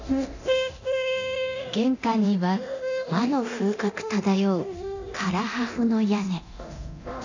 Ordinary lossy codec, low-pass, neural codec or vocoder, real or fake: none; 7.2 kHz; codec, 24 kHz, 0.9 kbps, DualCodec; fake